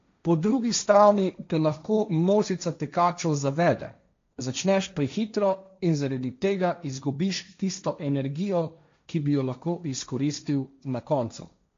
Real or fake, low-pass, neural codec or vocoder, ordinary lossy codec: fake; 7.2 kHz; codec, 16 kHz, 1.1 kbps, Voila-Tokenizer; MP3, 48 kbps